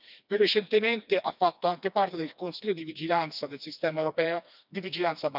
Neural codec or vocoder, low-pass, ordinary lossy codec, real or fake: codec, 16 kHz, 2 kbps, FreqCodec, smaller model; 5.4 kHz; none; fake